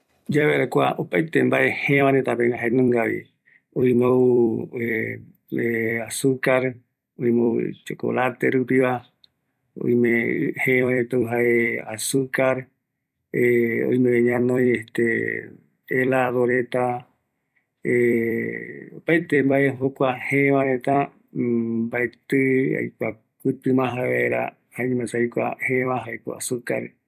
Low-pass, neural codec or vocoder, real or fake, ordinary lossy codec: 14.4 kHz; vocoder, 44.1 kHz, 128 mel bands every 256 samples, BigVGAN v2; fake; none